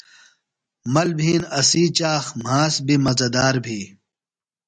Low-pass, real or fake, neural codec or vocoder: 9.9 kHz; real; none